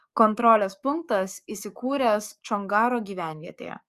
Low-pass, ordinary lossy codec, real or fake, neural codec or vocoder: 14.4 kHz; Opus, 64 kbps; fake; codec, 44.1 kHz, 7.8 kbps, DAC